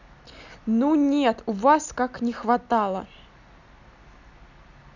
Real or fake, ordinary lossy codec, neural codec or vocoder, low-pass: real; none; none; 7.2 kHz